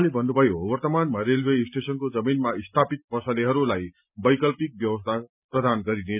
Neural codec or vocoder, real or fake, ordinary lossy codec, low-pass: none; real; none; 3.6 kHz